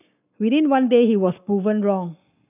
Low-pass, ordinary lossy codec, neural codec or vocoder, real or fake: 3.6 kHz; none; codec, 44.1 kHz, 7.8 kbps, Pupu-Codec; fake